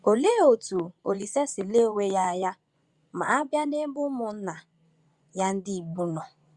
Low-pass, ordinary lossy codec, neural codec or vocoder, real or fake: 10.8 kHz; Opus, 64 kbps; vocoder, 24 kHz, 100 mel bands, Vocos; fake